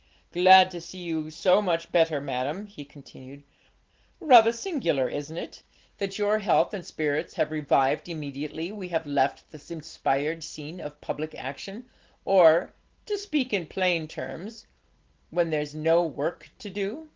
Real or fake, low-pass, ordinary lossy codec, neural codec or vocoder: real; 7.2 kHz; Opus, 16 kbps; none